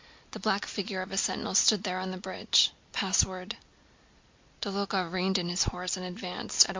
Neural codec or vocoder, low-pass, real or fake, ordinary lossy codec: none; 7.2 kHz; real; MP3, 48 kbps